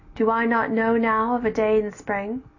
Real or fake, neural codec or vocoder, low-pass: real; none; 7.2 kHz